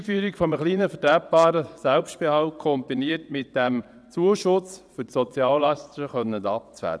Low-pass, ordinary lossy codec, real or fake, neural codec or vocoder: none; none; fake; vocoder, 22.05 kHz, 80 mel bands, WaveNeXt